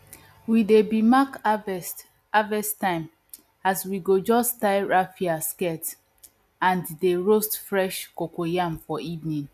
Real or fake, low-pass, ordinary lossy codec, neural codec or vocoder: real; 14.4 kHz; none; none